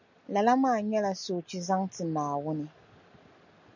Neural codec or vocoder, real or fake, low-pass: none; real; 7.2 kHz